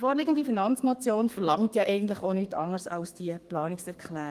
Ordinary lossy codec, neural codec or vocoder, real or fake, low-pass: Opus, 32 kbps; codec, 32 kHz, 1.9 kbps, SNAC; fake; 14.4 kHz